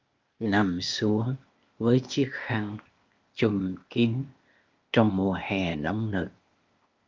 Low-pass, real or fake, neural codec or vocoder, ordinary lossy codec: 7.2 kHz; fake; codec, 16 kHz, 0.8 kbps, ZipCodec; Opus, 24 kbps